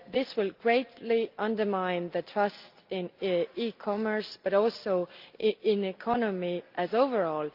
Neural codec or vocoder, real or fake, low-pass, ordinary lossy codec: none; real; 5.4 kHz; Opus, 24 kbps